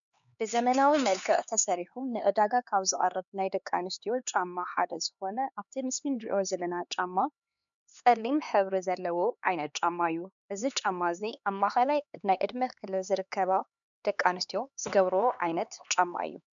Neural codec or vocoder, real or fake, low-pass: codec, 16 kHz, 4 kbps, X-Codec, HuBERT features, trained on LibriSpeech; fake; 7.2 kHz